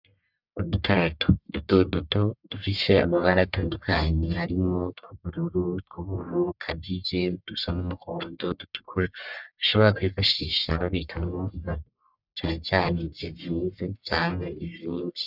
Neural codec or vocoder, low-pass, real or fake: codec, 44.1 kHz, 1.7 kbps, Pupu-Codec; 5.4 kHz; fake